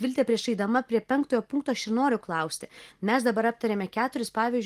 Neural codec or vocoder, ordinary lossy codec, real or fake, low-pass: none; Opus, 24 kbps; real; 14.4 kHz